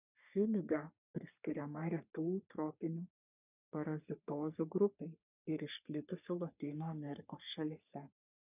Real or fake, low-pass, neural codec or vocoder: fake; 3.6 kHz; codec, 44.1 kHz, 3.4 kbps, Pupu-Codec